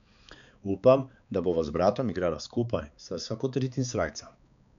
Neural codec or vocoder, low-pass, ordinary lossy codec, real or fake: codec, 16 kHz, 4 kbps, X-Codec, HuBERT features, trained on balanced general audio; 7.2 kHz; none; fake